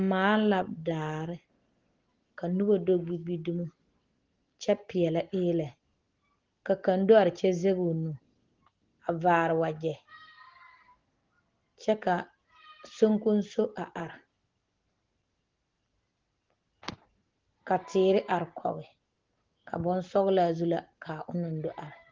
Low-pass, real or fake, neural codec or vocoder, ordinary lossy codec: 7.2 kHz; real; none; Opus, 16 kbps